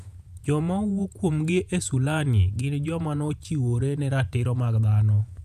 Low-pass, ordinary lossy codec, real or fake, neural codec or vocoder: 14.4 kHz; none; fake; vocoder, 48 kHz, 128 mel bands, Vocos